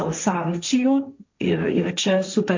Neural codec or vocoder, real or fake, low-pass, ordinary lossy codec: codec, 16 kHz, 1.1 kbps, Voila-Tokenizer; fake; 7.2 kHz; MP3, 48 kbps